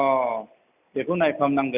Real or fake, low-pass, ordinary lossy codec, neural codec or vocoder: real; 3.6 kHz; none; none